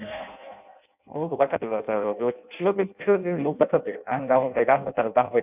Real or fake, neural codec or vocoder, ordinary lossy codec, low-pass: fake; codec, 16 kHz in and 24 kHz out, 0.6 kbps, FireRedTTS-2 codec; none; 3.6 kHz